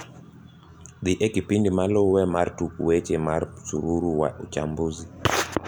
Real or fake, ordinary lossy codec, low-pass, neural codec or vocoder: real; none; none; none